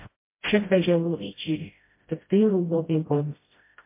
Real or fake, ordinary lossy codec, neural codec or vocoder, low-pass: fake; MP3, 24 kbps; codec, 16 kHz, 0.5 kbps, FreqCodec, smaller model; 3.6 kHz